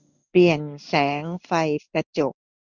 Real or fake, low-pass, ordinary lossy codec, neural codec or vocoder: fake; 7.2 kHz; none; codec, 16 kHz in and 24 kHz out, 1 kbps, XY-Tokenizer